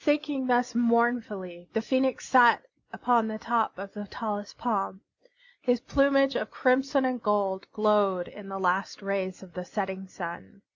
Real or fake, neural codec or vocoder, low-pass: real; none; 7.2 kHz